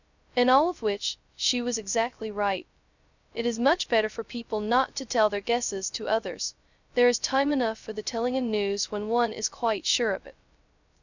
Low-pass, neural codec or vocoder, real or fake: 7.2 kHz; codec, 16 kHz, 0.2 kbps, FocalCodec; fake